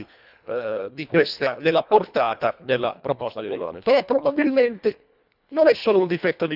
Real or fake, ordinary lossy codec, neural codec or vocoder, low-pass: fake; none; codec, 24 kHz, 1.5 kbps, HILCodec; 5.4 kHz